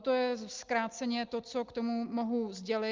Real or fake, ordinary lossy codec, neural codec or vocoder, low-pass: real; Opus, 32 kbps; none; 7.2 kHz